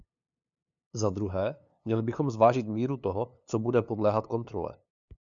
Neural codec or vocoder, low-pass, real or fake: codec, 16 kHz, 2 kbps, FunCodec, trained on LibriTTS, 25 frames a second; 7.2 kHz; fake